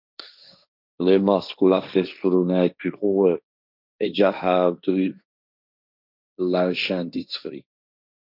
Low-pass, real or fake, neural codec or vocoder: 5.4 kHz; fake; codec, 16 kHz, 1.1 kbps, Voila-Tokenizer